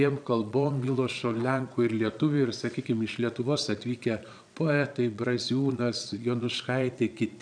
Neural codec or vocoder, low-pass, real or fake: vocoder, 22.05 kHz, 80 mel bands, Vocos; 9.9 kHz; fake